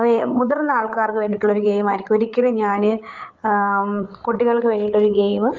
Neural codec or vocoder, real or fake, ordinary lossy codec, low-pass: codec, 16 kHz, 8 kbps, FreqCodec, larger model; fake; Opus, 32 kbps; 7.2 kHz